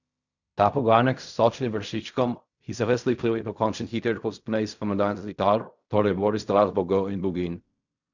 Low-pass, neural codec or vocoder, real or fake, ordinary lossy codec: 7.2 kHz; codec, 16 kHz in and 24 kHz out, 0.4 kbps, LongCat-Audio-Codec, fine tuned four codebook decoder; fake; none